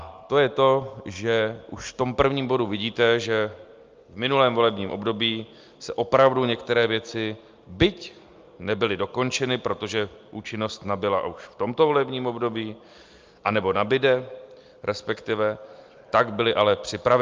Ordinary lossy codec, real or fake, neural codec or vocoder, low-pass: Opus, 24 kbps; real; none; 7.2 kHz